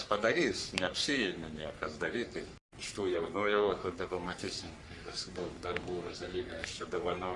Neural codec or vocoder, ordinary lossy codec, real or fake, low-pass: codec, 44.1 kHz, 3.4 kbps, Pupu-Codec; Opus, 64 kbps; fake; 10.8 kHz